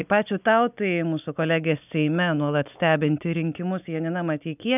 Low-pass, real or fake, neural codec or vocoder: 3.6 kHz; real; none